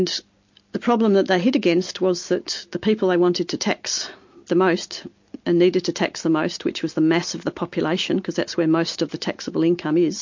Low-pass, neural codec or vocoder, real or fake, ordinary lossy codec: 7.2 kHz; none; real; MP3, 48 kbps